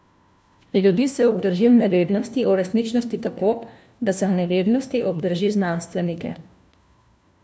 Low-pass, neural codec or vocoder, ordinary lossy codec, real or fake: none; codec, 16 kHz, 1 kbps, FunCodec, trained on LibriTTS, 50 frames a second; none; fake